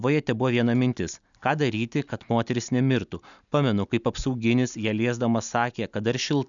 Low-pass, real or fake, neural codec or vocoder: 7.2 kHz; real; none